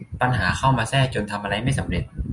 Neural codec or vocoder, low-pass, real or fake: none; 10.8 kHz; real